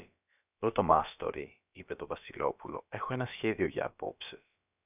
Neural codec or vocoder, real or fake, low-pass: codec, 16 kHz, about 1 kbps, DyCAST, with the encoder's durations; fake; 3.6 kHz